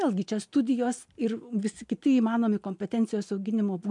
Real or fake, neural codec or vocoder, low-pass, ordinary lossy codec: real; none; 10.8 kHz; MP3, 64 kbps